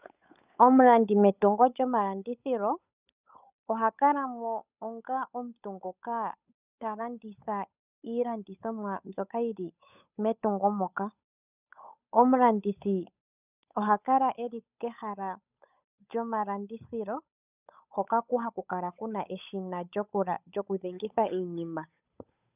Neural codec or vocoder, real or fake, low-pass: codec, 16 kHz, 8 kbps, FunCodec, trained on Chinese and English, 25 frames a second; fake; 3.6 kHz